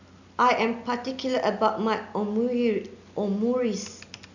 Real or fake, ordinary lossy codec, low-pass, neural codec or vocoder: real; none; 7.2 kHz; none